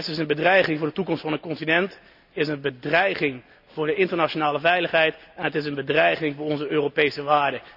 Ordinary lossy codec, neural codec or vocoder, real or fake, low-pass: none; none; real; 5.4 kHz